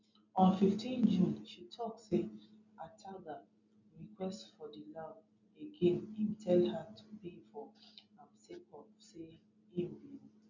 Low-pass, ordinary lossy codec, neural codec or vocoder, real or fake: 7.2 kHz; none; none; real